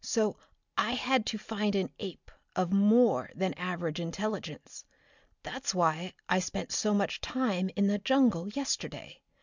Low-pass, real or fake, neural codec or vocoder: 7.2 kHz; real; none